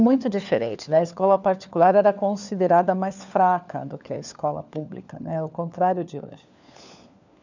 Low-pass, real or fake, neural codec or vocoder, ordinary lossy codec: 7.2 kHz; fake; codec, 16 kHz, 4 kbps, FunCodec, trained on LibriTTS, 50 frames a second; none